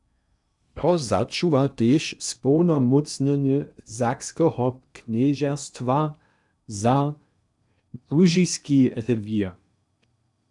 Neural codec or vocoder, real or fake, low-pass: codec, 16 kHz in and 24 kHz out, 0.8 kbps, FocalCodec, streaming, 65536 codes; fake; 10.8 kHz